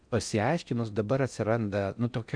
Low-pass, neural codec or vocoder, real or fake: 9.9 kHz; codec, 16 kHz in and 24 kHz out, 0.6 kbps, FocalCodec, streaming, 4096 codes; fake